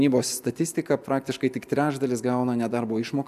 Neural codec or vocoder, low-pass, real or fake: none; 14.4 kHz; real